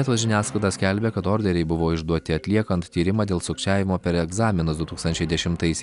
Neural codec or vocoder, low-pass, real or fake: none; 10.8 kHz; real